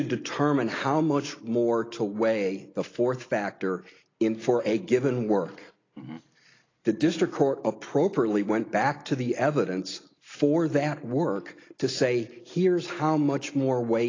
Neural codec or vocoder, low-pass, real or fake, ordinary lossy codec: none; 7.2 kHz; real; AAC, 32 kbps